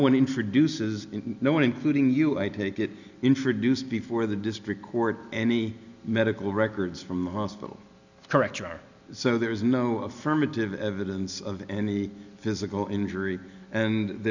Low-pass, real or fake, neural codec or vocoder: 7.2 kHz; real; none